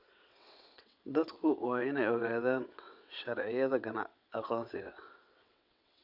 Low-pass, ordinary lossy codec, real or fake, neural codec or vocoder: 5.4 kHz; none; fake; vocoder, 22.05 kHz, 80 mel bands, WaveNeXt